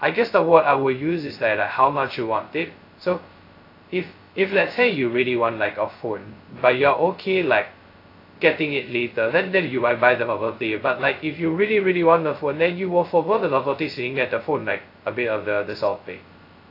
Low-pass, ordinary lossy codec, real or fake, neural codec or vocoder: 5.4 kHz; AAC, 32 kbps; fake; codec, 16 kHz, 0.2 kbps, FocalCodec